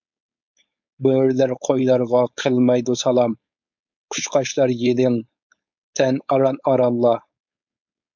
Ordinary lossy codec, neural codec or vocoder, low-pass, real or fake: MP3, 64 kbps; codec, 16 kHz, 4.8 kbps, FACodec; 7.2 kHz; fake